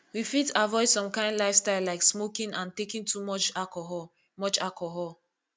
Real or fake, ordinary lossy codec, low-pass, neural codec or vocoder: real; none; none; none